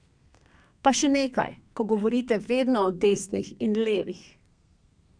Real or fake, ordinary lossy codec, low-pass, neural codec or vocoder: fake; none; 9.9 kHz; codec, 32 kHz, 1.9 kbps, SNAC